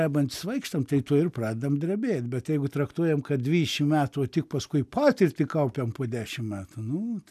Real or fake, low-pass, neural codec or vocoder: real; 14.4 kHz; none